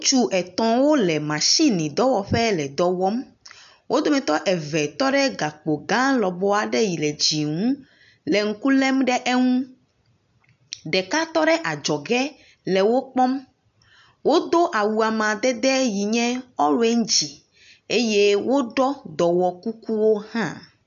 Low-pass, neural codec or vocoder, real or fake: 7.2 kHz; none; real